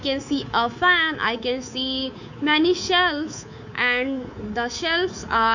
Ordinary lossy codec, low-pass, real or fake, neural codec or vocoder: none; 7.2 kHz; fake; codec, 24 kHz, 3.1 kbps, DualCodec